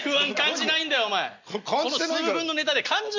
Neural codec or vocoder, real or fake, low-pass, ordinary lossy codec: none; real; 7.2 kHz; MP3, 64 kbps